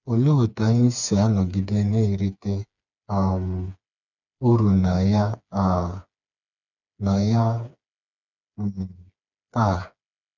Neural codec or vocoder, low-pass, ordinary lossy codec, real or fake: codec, 16 kHz, 4 kbps, FreqCodec, smaller model; 7.2 kHz; none; fake